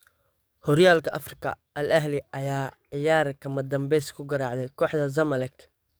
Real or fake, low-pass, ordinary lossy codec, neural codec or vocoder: fake; none; none; codec, 44.1 kHz, 7.8 kbps, DAC